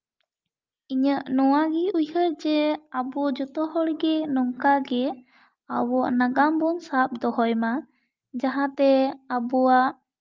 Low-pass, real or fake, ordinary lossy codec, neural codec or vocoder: 7.2 kHz; real; Opus, 24 kbps; none